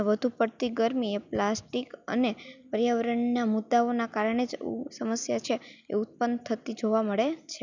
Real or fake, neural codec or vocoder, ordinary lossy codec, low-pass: real; none; none; 7.2 kHz